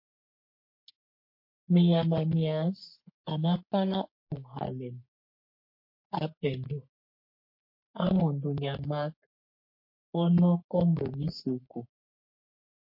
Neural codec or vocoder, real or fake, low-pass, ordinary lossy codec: codec, 44.1 kHz, 3.4 kbps, Pupu-Codec; fake; 5.4 kHz; MP3, 32 kbps